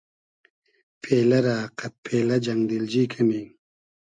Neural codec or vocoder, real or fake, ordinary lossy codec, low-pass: none; real; MP3, 64 kbps; 9.9 kHz